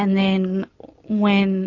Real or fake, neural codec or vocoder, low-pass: real; none; 7.2 kHz